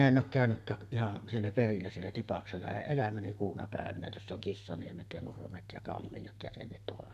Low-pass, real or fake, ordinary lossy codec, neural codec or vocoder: 14.4 kHz; fake; none; codec, 32 kHz, 1.9 kbps, SNAC